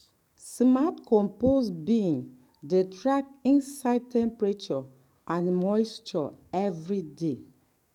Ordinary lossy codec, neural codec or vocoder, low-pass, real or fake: none; codec, 44.1 kHz, 7.8 kbps, DAC; 19.8 kHz; fake